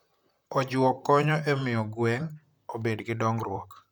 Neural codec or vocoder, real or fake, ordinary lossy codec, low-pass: vocoder, 44.1 kHz, 128 mel bands, Pupu-Vocoder; fake; none; none